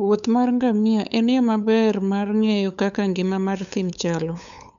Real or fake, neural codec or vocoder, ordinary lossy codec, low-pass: fake; codec, 16 kHz, 8 kbps, FunCodec, trained on LibriTTS, 25 frames a second; none; 7.2 kHz